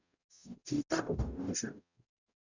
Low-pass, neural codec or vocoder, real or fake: 7.2 kHz; codec, 44.1 kHz, 0.9 kbps, DAC; fake